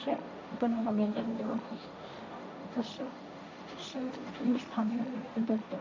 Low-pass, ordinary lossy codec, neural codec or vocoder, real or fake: none; none; codec, 16 kHz, 1.1 kbps, Voila-Tokenizer; fake